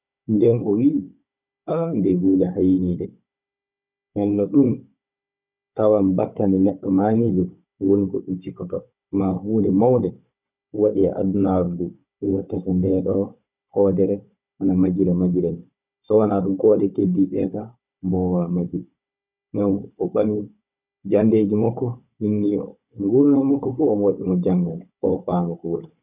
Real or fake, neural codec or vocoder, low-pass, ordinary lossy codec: fake; codec, 16 kHz, 16 kbps, FunCodec, trained on Chinese and English, 50 frames a second; 3.6 kHz; none